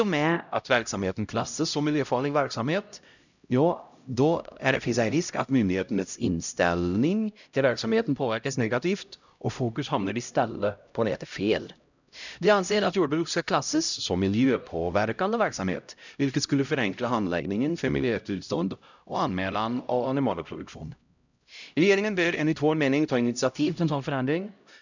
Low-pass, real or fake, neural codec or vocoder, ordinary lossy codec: 7.2 kHz; fake; codec, 16 kHz, 0.5 kbps, X-Codec, HuBERT features, trained on LibriSpeech; none